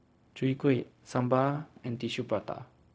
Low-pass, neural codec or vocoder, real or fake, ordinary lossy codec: none; codec, 16 kHz, 0.4 kbps, LongCat-Audio-Codec; fake; none